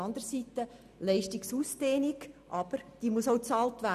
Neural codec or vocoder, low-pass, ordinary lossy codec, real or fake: none; 14.4 kHz; none; real